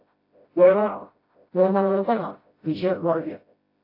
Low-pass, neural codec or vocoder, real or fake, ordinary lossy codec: 5.4 kHz; codec, 16 kHz, 0.5 kbps, FreqCodec, smaller model; fake; AAC, 32 kbps